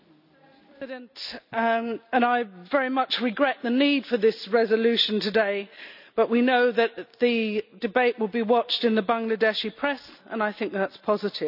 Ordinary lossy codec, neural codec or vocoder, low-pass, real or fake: none; none; 5.4 kHz; real